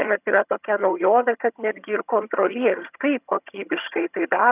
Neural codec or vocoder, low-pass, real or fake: vocoder, 22.05 kHz, 80 mel bands, HiFi-GAN; 3.6 kHz; fake